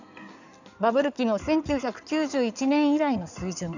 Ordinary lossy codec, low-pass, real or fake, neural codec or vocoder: none; 7.2 kHz; fake; codec, 16 kHz in and 24 kHz out, 2.2 kbps, FireRedTTS-2 codec